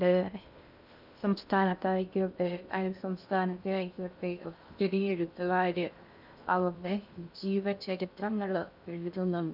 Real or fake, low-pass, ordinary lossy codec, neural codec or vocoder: fake; 5.4 kHz; none; codec, 16 kHz in and 24 kHz out, 0.6 kbps, FocalCodec, streaming, 2048 codes